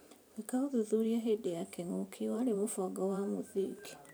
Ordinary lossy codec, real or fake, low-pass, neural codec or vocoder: none; fake; none; vocoder, 44.1 kHz, 128 mel bands every 512 samples, BigVGAN v2